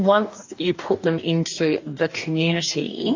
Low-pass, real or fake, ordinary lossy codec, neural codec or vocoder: 7.2 kHz; fake; AAC, 48 kbps; codec, 44.1 kHz, 2.6 kbps, DAC